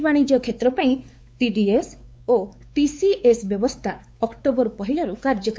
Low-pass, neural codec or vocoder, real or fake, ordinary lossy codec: none; codec, 16 kHz, 4 kbps, X-Codec, WavLM features, trained on Multilingual LibriSpeech; fake; none